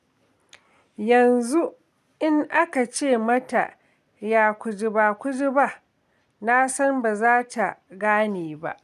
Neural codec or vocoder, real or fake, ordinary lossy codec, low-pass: none; real; none; 14.4 kHz